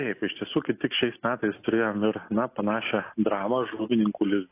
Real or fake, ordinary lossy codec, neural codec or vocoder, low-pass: real; AAC, 24 kbps; none; 3.6 kHz